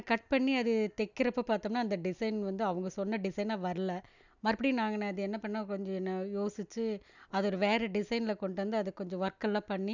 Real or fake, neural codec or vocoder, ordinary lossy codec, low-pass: real; none; none; 7.2 kHz